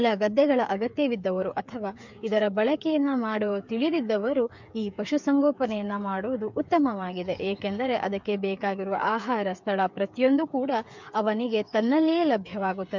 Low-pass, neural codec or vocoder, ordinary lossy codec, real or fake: 7.2 kHz; codec, 16 kHz, 8 kbps, FreqCodec, smaller model; MP3, 64 kbps; fake